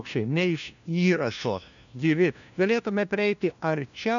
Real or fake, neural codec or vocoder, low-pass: fake; codec, 16 kHz, 1 kbps, FunCodec, trained on LibriTTS, 50 frames a second; 7.2 kHz